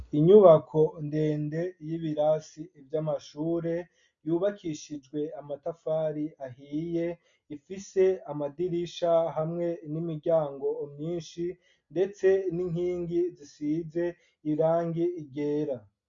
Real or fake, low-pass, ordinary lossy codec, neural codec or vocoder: real; 7.2 kHz; MP3, 64 kbps; none